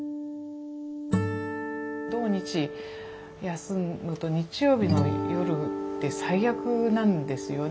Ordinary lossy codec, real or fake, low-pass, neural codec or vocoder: none; real; none; none